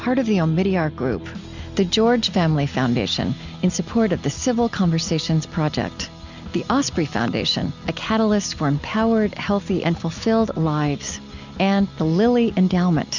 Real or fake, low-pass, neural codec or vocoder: real; 7.2 kHz; none